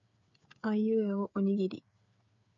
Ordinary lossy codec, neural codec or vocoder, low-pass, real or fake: AAC, 64 kbps; codec, 16 kHz, 8 kbps, FreqCodec, smaller model; 7.2 kHz; fake